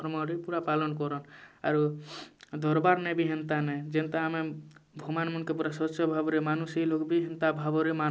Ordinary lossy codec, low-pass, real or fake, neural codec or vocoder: none; none; real; none